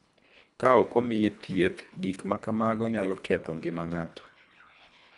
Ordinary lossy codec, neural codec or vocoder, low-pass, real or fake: none; codec, 24 kHz, 1.5 kbps, HILCodec; 10.8 kHz; fake